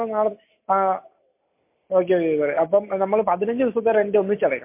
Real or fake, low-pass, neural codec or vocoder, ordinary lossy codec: real; 3.6 kHz; none; MP3, 24 kbps